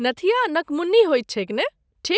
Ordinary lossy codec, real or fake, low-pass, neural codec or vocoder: none; real; none; none